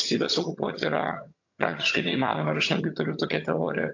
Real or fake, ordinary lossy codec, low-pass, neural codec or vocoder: fake; AAC, 48 kbps; 7.2 kHz; vocoder, 22.05 kHz, 80 mel bands, HiFi-GAN